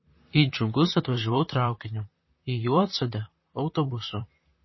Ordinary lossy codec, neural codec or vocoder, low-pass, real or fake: MP3, 24 kbps; vocoder, 44.1 kHz, 128 mel bands, Pupu-Vocoder; 7.2 kHz; fake